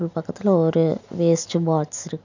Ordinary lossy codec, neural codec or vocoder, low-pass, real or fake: none; none; 7.2 kHz; real